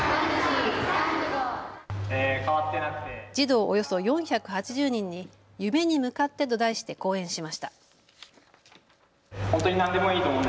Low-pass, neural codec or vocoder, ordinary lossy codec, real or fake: none; none; none; real